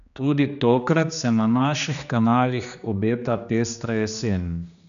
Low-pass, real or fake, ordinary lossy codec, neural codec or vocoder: 7.2 kHz; fake; none; codec, 16 kHz, 2 kbps, X-Codec, HuBERT features, trained on general audio